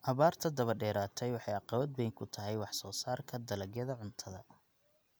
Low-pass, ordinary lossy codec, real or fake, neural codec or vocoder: none; none; real; none